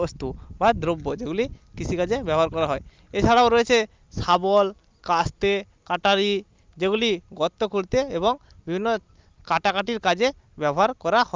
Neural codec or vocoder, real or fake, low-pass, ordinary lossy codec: none; real; 7.2 kHz; Opus, 24 kbps